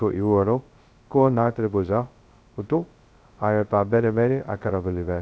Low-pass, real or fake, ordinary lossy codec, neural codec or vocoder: none; fake; none; codec, 16 kHz, 0.2 kbps, FocalCodec